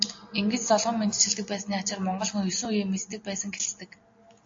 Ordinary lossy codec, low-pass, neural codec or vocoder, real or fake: AAC, 48 kbps; 7.2 kHz; none; real